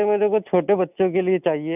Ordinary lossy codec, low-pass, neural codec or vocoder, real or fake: none; 3.6 kHz; none; real